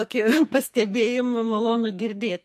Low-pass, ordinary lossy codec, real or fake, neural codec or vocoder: 14.4 kHz; MP3, 64 kbps; fake; codec, 44.1 kHz, 2.6 kbps, SNAC